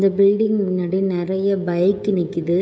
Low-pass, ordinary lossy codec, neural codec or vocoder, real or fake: none; none; codec, 16 kHz, 16 kbps, FreqCodec, smaller model; fake